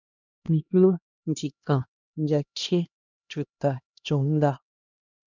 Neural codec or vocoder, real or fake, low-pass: codec, 16 kHz, 1 kbps, X-Codec, HuBERT features, trained on LibriSpeech; fake; 7.2 kHz